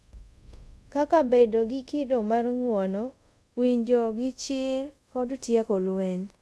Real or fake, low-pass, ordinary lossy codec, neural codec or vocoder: fake; none; none; codec, 24 kHz, 0.5 kbps, DualCodec